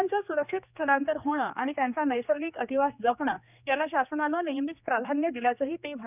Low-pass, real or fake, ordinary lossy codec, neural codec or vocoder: 3.6 kHz; fake; none; codec, 16 kHz, 2 kbps, X-Codec, HuBERT features, trained on general audio